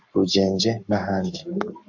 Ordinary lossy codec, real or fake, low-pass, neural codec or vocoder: AAC, 48 kbps; fake; 7.2 kHz; codec, 44.1 kHz, 7.8 kbps, Pupu-Codec